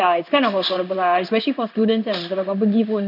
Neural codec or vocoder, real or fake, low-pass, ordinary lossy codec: codec, 16 kHz in and 24 kHz out, 1 kbps, XY-Tokenizer; fake; 5.4 kHz; none